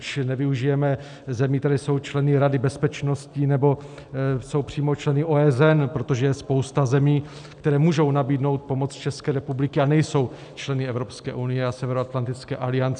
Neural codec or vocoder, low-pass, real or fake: none; 9.9 kHz; real